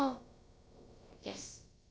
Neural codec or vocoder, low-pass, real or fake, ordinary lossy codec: codec, 16 kHz, about 1 kbps, DyCAST, with the encoder's durations; none; fake; none